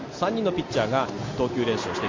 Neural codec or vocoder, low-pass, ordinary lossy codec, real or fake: none; 7.2 kHz; MP3, 48 kbps; real